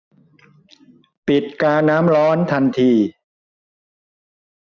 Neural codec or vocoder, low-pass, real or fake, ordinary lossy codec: none; 7.2 kHz; real; none